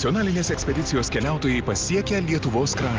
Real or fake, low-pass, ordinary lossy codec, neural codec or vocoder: real; 7.2 kHz; Opus, 24 kbps; none